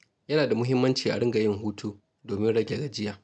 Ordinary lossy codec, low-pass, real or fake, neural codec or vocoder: none; none; real; none